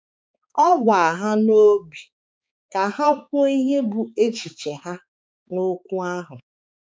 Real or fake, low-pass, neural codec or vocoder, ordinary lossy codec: fake; none; codec, 16 kHz, 4 kbps, X-Codec, HuBERT features, trained on balanced general audio; none